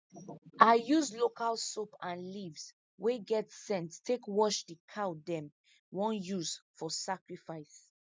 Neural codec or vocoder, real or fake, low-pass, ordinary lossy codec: none; real; none; none